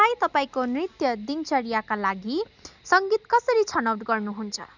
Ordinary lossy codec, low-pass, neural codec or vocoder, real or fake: none; 7.2 kHz; none; real